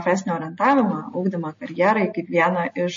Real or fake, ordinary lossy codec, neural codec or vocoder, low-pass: real; MP3, 32 kbps; none; 7.2 kHz